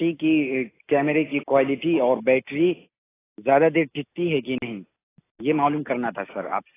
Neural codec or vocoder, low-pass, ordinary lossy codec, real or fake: none; 3.6 kHz; AAC, 16 kbps; real